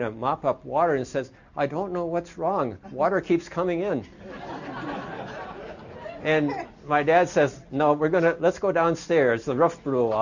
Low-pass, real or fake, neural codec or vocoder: 7.2 kHz; real; none